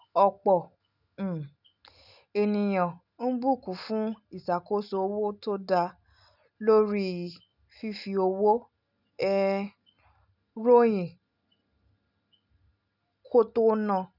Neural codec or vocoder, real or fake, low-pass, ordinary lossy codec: none; real; 5.4 kHz; none